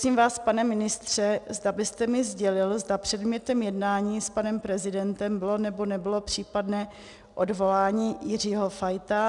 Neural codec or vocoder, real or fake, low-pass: none; real; 10.8 kHz